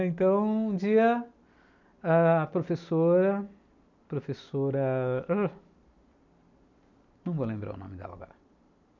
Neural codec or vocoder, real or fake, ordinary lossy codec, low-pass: none; real; none; 7.2 kHz